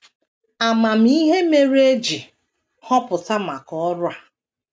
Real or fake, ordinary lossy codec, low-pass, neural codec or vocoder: real; none; none; none